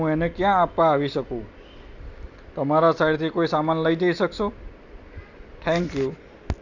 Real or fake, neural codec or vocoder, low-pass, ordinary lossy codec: real; none; 7.2 kHz; none